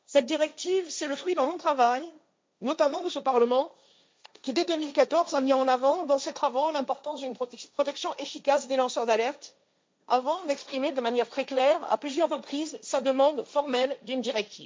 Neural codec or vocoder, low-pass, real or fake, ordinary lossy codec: codec, 16 kHz, 1.1 kbps, Voila-Tokenizer; none; fake; none